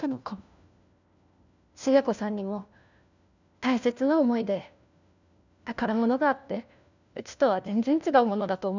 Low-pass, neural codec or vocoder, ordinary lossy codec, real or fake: 7.2 kHz; codec, 16 kHz, 1 kbps, FunCodec, trained on LibriTTS, 50 frames a second; none; fake